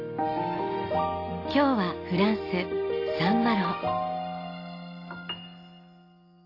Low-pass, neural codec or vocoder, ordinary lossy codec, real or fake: 5.4 kHz; none; AAC, 32 kbps; real